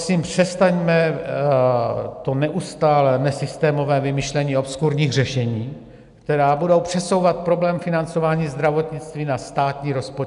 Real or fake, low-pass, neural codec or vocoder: real; 10.8 kHz; none